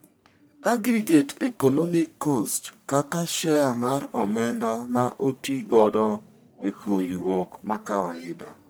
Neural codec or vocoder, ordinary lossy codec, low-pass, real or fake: codec, 44.1 kHz, 1.7 kbps, Pupu-Codec; none; none; fake